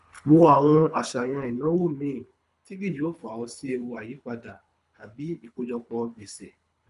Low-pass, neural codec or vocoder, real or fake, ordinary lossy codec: 10.8 kHz; codec, 24 kHz, 3 kbps, HILCodec; fake; MP3, 96 kbps